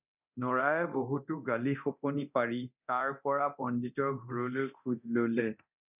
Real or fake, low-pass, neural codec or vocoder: fake; 3.6 kHz; codec, 24 kHz, 0.9 kbps, DualCodec